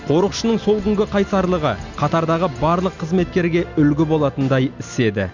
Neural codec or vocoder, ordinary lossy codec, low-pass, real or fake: none; none; 7.2 kHz; real